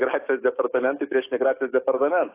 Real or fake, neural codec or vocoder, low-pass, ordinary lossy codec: fake; codec, 44.1 kHz, 7.8 kbps, DAC; 3.6 kHz; AAC, 24 kbps